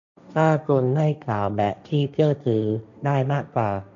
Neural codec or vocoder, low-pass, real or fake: codec, 16 kHz, 1.1 kbps, Voila-Tokenizer; 7.2 kHz; fake